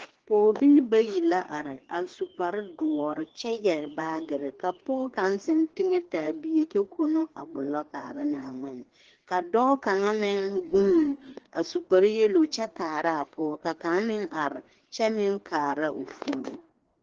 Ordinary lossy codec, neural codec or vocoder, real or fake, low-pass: Opus, 16 kbps; codec, 16 kHz, 2 kbps, FreqCodec, larger model; fake; 7.2 kHz